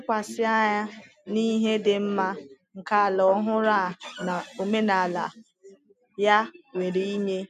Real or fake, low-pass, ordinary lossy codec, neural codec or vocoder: real; 14.4 kHz; MP3, 96 kbps; none